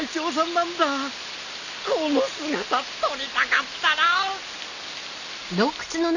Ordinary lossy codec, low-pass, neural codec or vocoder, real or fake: none; 7.2 kHz; none; real